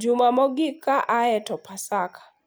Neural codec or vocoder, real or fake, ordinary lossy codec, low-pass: none; real; none; none